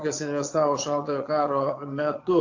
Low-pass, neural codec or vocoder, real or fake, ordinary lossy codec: 7.2 kHz; vocoder, 22.05 kHz, 80 mel bands, Vocos; fake; AAC, 48 kbps